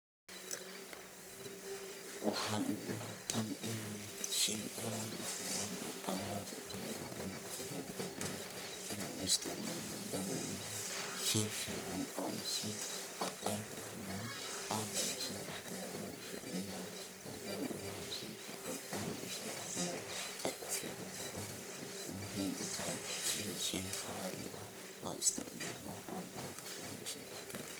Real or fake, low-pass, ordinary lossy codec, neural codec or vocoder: fake; none; none; codec, 44.1 kHz, 1.7 kbps, Pupu-Codec